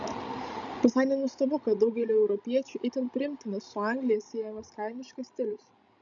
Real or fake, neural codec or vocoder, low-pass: fake; codec, 16 kHz, 16 kbps, FreqCodec, larger model; 7.2 kHz